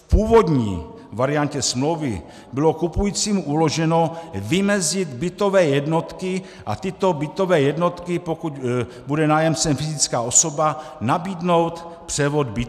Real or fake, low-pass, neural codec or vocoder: real; 14.4 kHz; none